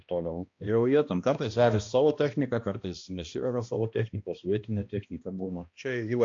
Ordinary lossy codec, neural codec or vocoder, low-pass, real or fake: AAC, 48 kbps; codec, 16 kHz, 1 kbps, X-Codec, HuBERT features, trained on balanced general audio; 7.2 kHz; fake